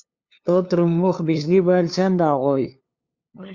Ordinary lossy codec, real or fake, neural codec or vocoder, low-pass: Opus, 64 kbps; fake; codec, 16 kHz, 2 kbps, FunCodec, trained on LibriTTS, 25 frames a second; 7.2 kHz